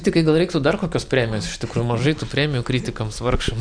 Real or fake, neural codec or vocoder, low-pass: real; none; 9.9 kHz